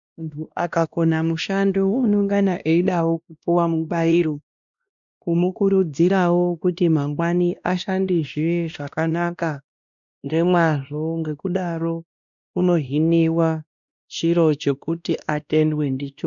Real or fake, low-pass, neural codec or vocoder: fake; 7.2 kHz; codec, 16 kHz, 1 kbps, X-Codec, WavLM features, trained on Multilingual LibriSpeech